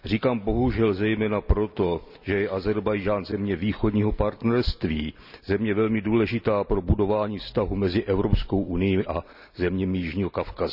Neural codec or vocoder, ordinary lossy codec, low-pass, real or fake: none; none; 5.4 kHz; real